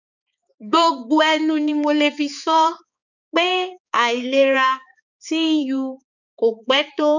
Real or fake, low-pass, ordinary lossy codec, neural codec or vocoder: fake; 7.2 kHz; none; codec, 16 kHz, 4 kbps, X-Codec, HuBERT features, trained on balanced general audio